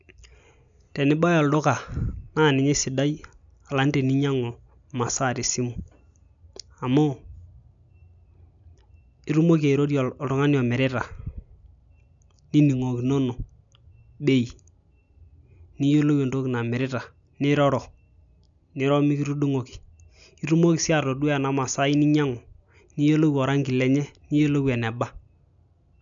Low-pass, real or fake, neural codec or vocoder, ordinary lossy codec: 7.2 kHz; real; none; none